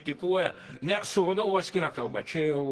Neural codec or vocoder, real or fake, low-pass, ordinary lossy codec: codec, 24 kHz, 0.9 kbps, WavTokenizer, medium music audio release; fake; 10.8 kHz; Opus, 16 kbps